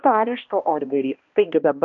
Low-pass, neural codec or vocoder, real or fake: 7.2 kHz; codec, 16 kHz, 1 kbps, X-Codec, HuBERT features, trained on balanced general audio; fake